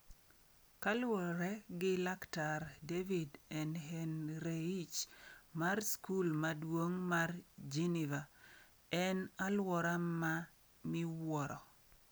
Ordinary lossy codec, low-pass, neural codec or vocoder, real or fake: none; none; none; real